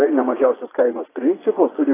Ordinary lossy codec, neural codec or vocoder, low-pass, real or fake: AAC, 16 kbps; vocoder, 22.05 kHz, 80 mel bands, Vocos; 3.6 kHz; fake